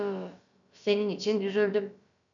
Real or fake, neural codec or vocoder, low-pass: fake; codec, 16 kHz, about 1 kbps, DyCAST, with the encoder's durations; 7.2 kHz